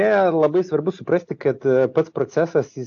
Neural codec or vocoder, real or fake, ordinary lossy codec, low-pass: none; real; AAC, 48 kbps; 7.2 kHz